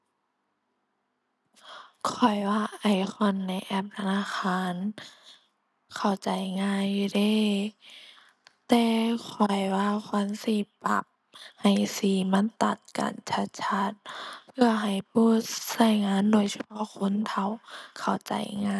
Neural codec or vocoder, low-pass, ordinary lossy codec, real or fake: none; none; none; real